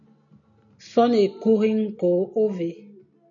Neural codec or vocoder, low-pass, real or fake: none; 7.2 kHz; real